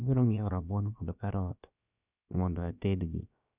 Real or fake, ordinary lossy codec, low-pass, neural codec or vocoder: fake; none; 3.6 kHz; codec, 16 kHz, about 1 kbps, DyCAST, with the encoder's durations